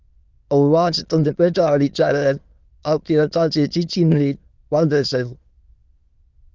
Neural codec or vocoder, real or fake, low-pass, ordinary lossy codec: autoencoder, 22.05 kHz, a latent of 192 numbers a frame, VITS, trained on many speakers; fake; 7.2 kHz; Opus, 24 kbps